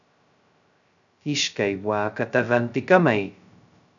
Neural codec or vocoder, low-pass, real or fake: codec, 16 kHz, 0.2 kbps, FocalCodec; 7.2 kHz; fake